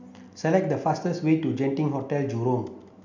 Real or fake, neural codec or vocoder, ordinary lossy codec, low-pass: real; none; none; 7.2 kHz